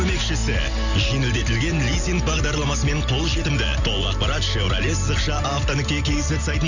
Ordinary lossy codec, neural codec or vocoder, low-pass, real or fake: none; none; 7.2 kHz; real